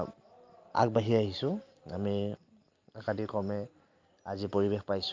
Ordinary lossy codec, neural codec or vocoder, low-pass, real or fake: Opus, 24 kbps; none; 7.2 kHz; real